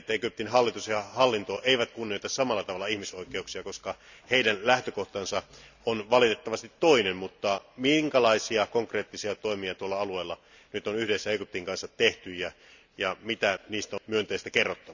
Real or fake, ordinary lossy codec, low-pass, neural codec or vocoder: real; none; 7.2 kHz; none